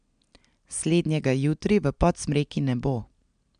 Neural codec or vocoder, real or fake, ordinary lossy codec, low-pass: none; real; MP3, 96 kbps; 9.9 kHz